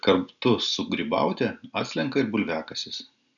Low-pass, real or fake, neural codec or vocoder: 7.2 kHz; real; none